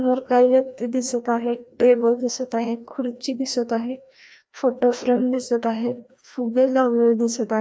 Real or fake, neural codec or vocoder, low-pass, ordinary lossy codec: fake; codec, 16 kHz, 1 kbps, FreqCodec, larger model; none; none